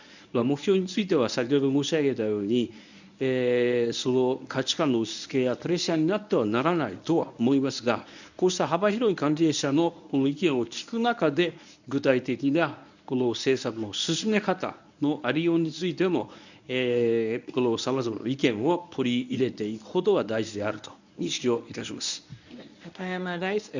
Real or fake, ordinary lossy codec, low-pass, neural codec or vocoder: fake; none; 7.2 kHz; codec, 24 kHz, 0.9 kbps, WavTokenizer, medium speech release version 1